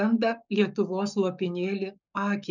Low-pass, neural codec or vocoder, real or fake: 7.2 kHz; codec, 44.1 kHz, 7.8 kbps, Pupu-Codec; fake